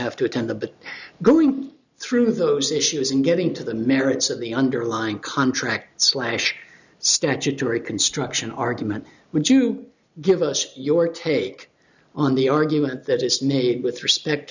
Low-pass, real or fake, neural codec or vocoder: 7.2 kHz; real; none